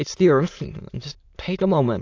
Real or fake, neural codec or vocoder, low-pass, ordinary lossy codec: fake; autoencoder, 22.05 kHz, a latent of 192 numbers a frame, VITS, trained on many speakers; 7.2 kHz; AAC, 48 kbps